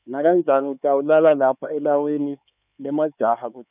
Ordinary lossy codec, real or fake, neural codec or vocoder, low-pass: none; fake; codec, 16 kHz, 4 kbps, X-Codec, HuBERT features, trained on LibriSpeech; 3.6 kHz